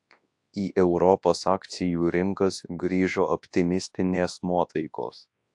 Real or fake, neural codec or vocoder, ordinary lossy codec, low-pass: fake; codec, 24 kHz, 0.9 kbps, WavTokenizer, large speech release; AAC, 64 kbps; 10.8 kHz